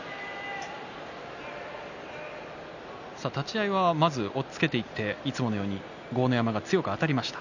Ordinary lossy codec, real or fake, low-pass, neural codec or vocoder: none; real; 7.2 kHz; none